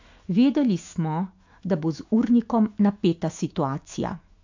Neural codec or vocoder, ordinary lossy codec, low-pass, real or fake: autoencoder, 48 kHz, 128 numbers a frame, DAC-VAE, trained on Japanese speech; AAC, 48 kbps; 7.2 kHz; fake